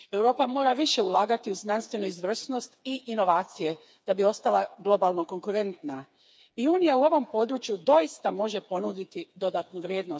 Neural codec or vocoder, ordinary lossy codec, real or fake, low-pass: codec, 16 kHz, 4 kbps, FreqCodec, smaller model; none; fake; none